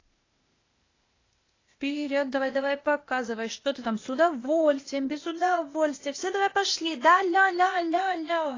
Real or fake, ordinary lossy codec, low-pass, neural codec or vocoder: fake; AAC, 32 kbps; 7.2 kHz; codec, 16 kHz, 0.8 kbps, ZipCodec